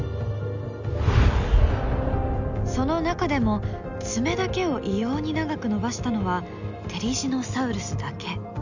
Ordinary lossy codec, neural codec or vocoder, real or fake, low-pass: none; none; real; 7.2 kHz